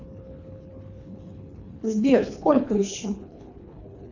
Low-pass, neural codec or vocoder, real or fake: 7.2 kHz; codec, 24 kHz, 3 kbps, HILCodec; fake